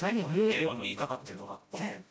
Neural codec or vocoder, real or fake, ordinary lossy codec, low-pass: codec, 16 kHz, 0.5 kbps, FreqCodec, smaller model; fake; none; none